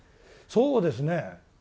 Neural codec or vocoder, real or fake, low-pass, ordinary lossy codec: none; real; none; none